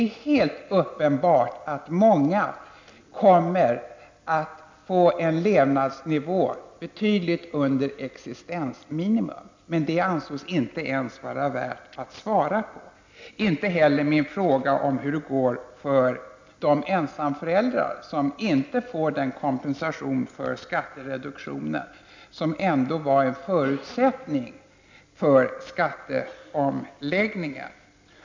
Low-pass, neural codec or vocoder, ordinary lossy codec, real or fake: 7.2 kHz; none; MP3, 64 kbps; real